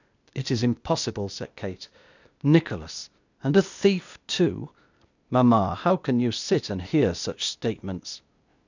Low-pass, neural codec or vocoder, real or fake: 7.2 kHz; codec, 16 kHz, 0.8 kbps, ZipCodec; fake